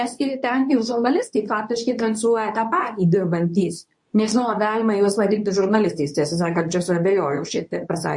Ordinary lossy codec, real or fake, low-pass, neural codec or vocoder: MP3, 48 kbps; fake; 10.8 kHz; codec, 24 kHz, 0.9 kbps, WavTokenizer, medium speech release version 2